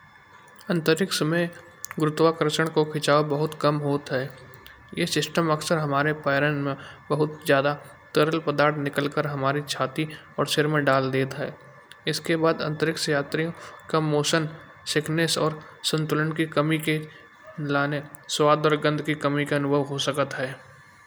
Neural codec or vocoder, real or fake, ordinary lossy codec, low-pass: none; real; none; none